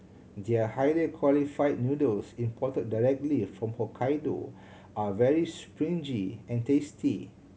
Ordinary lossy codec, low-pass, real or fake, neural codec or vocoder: none; none; real; none